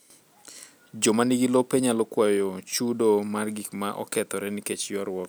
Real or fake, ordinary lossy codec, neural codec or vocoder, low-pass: real; none; none; none